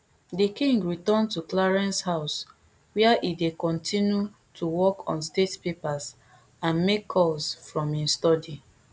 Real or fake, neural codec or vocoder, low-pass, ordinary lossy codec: real; none; none; none